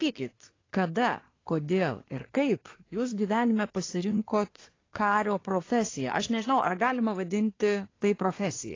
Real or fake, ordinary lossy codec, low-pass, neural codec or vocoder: fake; AAC, 32 kbps; 7.2 kHz; codec, 24 kHz, 1 kbps, SNAC